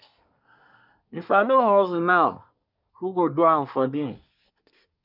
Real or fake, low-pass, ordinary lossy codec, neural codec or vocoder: fake; 5.4 kHz; none; codec, 24 kHz, 1 kbps, SNAC